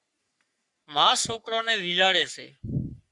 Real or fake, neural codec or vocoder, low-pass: fake; codec, 44.1 kHz, 3.4 kbps, Pupu-Codec; 10.8 kHz